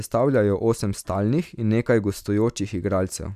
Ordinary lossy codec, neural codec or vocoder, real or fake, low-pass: none; none; real; 14.4 kHz